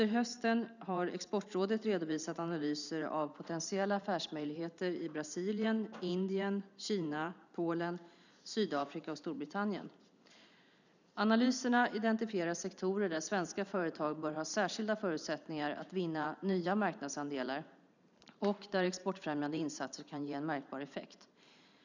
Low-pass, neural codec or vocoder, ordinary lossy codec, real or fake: 7.2 kHz; vocoder, 44.1 kHz, 128 mel bands every 512 samples, BigVGAN v2; none; fake